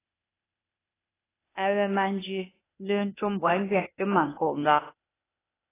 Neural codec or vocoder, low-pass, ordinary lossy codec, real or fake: codec, 16 kHz, 0.8 kbps, ZipCodec; 3.6 kHz; AAC, 16 kbps; fake